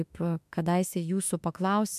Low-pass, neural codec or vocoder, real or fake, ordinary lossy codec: 14.4 kHz; autoencoder, 48 kHz, 32 numbers a frame, DAC-VAE, trained on Japanese speech; fake; MP3, 96 kbps